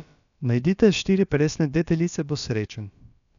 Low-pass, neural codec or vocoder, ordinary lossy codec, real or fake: 7.2 kHz; codec, 16 kHz, about 1 kbps, DyCAST, with the encoder's durations; none; fake